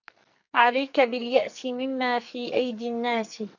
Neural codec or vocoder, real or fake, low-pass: codec, 44.1 kHz, 2.6 kbps, SNAC; fake; 7.2 kHz